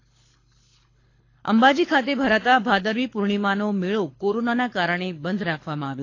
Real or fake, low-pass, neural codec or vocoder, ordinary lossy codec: fake; 7.2 kHz; codec, 24 kHz, 6 kbps, HILCodec; AAC, 32 kbps